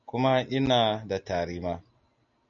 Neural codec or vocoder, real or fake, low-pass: none; real; 7.2 kHz